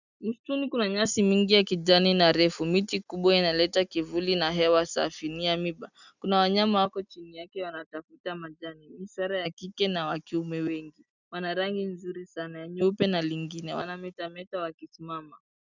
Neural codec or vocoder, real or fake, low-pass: none; real; 7.2 kHz